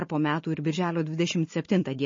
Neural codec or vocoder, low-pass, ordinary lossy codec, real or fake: none; 7.2 kHz; MP3, 32 kbps; real